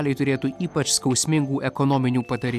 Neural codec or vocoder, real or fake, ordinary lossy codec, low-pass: none; real; AAC, 96 kbps; 14.4 kHz